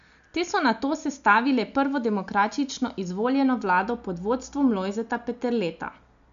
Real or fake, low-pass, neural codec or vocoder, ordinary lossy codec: real; 7.2 kHz; none; none